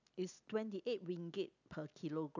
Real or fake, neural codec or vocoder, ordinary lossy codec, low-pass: real; none; none; 7.2 kHz